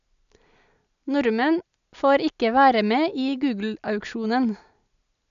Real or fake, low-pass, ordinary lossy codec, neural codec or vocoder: real; 7.2 kHz; none; none